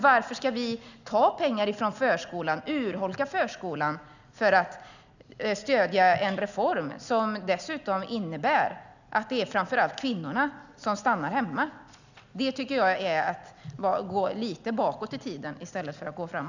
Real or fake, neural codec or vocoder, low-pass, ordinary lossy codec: real; none; 7.2 kHz; none